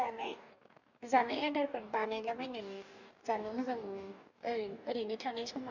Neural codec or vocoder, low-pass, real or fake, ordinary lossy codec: codec, 44.1 kHz, 2.6 kbps, DAC; 7.2 kHz; fake; none